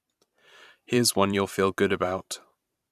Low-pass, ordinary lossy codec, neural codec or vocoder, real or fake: 14.4 kHz; none; vocoder, 44.1 kHz, 128 mel bands every 512 samples, BigVGAN v2; fake